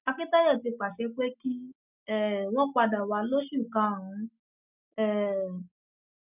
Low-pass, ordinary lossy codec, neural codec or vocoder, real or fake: 3.6 kHz; none; none; real